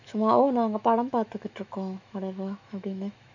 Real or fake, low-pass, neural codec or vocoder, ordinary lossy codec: fake; 7.2 kHz; autoencoder, 48 kHz, 128 numbers a frame, DAC-VAE, trained on Japanese speech; none